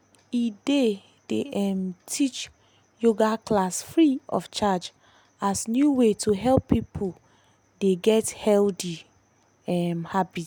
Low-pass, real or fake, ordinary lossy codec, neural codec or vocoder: none; real; none; none